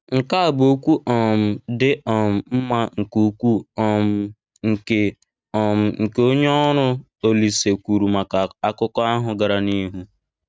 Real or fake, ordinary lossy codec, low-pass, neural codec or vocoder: real; none; none; none